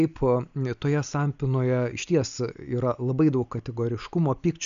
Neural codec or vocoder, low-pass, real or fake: none; 7.2 kHz; real